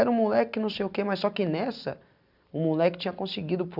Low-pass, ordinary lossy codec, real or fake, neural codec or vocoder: 5.4 kHz; none; real; none